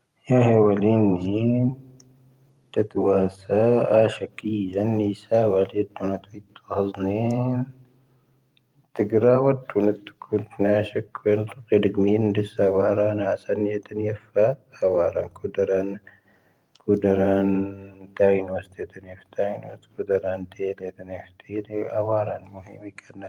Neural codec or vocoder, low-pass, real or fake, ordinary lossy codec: vocoder, 44.1 kHz, 128 mel bands every 512 samples, BigVGAN v2; 19.8 kHz; fake; Opus, 32 kbps